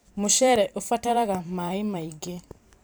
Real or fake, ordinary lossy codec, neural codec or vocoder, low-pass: fake; none; vocoder, 44.1 kHz, 128 mel bands every 512 samples, BigVGAN v2; none